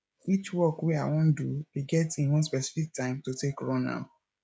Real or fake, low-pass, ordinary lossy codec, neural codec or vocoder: fake; none; none; codec, 16 kHz, 16 kbps, FreqCodec, smaller model